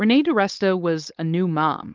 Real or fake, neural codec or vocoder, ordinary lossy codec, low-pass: fake; codec, 16 kHz, 4.8 kbps, FACodec; Opus, 32 kbps; 7.2 kHz